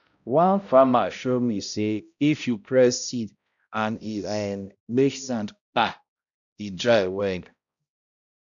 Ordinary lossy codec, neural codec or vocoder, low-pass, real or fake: none; codec, 16 kHz, 0.5 kbps, X-Codec, HuBERT features, trained on balanced general audio; 7.2 kHz; fake